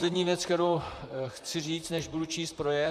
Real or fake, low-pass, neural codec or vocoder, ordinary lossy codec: fake; 14.4 kHz; vocoder, 44.1 kHz, 128 mel bands, Pupu-Vocoder; MP3, 96 kbps